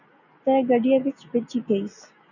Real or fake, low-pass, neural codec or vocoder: real; 7.2 kHz; none